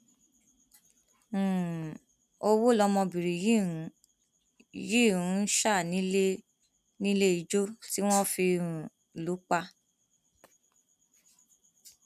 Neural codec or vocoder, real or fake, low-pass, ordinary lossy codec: none; real; 14.4 kHz; none